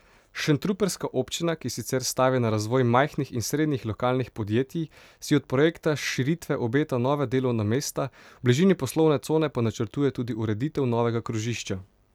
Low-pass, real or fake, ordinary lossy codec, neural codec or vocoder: 19.8 kHz; real; none; none